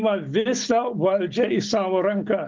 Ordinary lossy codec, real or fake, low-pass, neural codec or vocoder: Opus, 32 kbps; real; 7.2 kHz; none